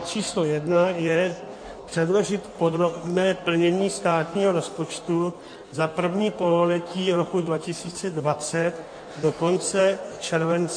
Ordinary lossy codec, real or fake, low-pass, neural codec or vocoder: MP3, 48 kbps; fake; 9.9 kHz; codec, 16 kHz in and 24 kHz out, 1.1 kbps, FireRedTTS-2 codec